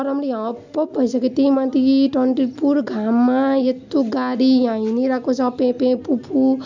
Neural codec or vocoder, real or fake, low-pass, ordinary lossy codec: none; real; 7.2 kHz; MP3, 64 kbps